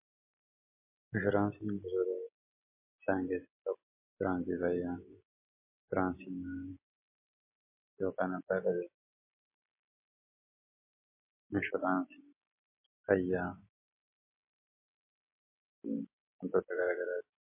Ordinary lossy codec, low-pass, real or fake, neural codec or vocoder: MP3, 24 kbps; 3.6 kHz; real; none